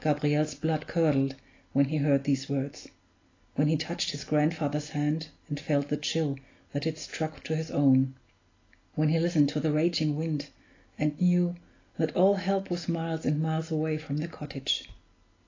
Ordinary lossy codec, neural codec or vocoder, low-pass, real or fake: AAC, 32 kbps; none; 7.2 kHz; real